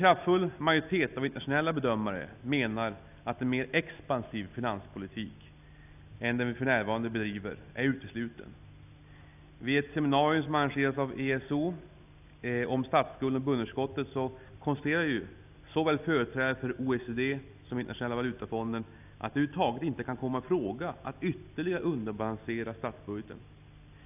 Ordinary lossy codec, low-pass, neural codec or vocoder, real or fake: none; 3.6 kHz; none; real